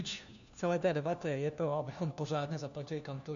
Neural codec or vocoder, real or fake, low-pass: codec, 16 kHz, 1 kbps, FunCodec, trained on LibriTTS, 50 frames a second; fake; 7.2 kHz